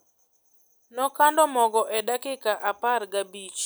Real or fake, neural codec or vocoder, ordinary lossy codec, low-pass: real; none; none; none